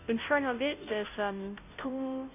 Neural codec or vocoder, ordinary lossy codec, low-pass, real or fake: codec, 16 kHz, 0.5 kbps, FunCodec, trained on Chinese and English, 25 frames a second; none; 3.6 kHz; fake